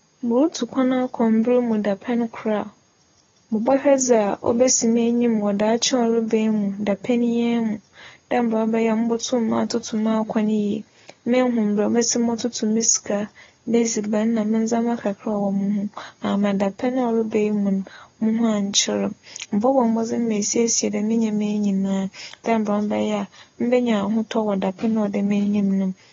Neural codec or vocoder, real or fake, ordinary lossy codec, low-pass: none; real; AAC, 24 kbps; 7.2 kHz